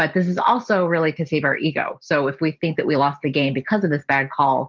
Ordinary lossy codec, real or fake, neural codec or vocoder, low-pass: Opus, 32 kbps; real; none; 7.2 kHz